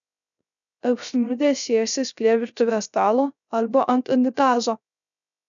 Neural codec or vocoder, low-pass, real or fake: codec, 16 kHz, 0.3 kbps, FocalCodec; 7.2 kHz; fake